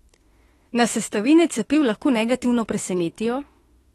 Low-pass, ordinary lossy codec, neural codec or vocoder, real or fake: 19.8 kHz; AAC, 32 kbps; autoencoder, 48 kHz, 32 numbers a frame, DAC-VAE, trained on Japanese speech; fake